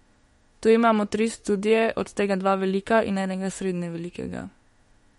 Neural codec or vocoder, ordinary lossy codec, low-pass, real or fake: autoencoder, 48 kHz, 32 numbers a frame, DAC-VAE, trained on Japanese speech; MP3, 48 kbps; 19.8 kHz; fake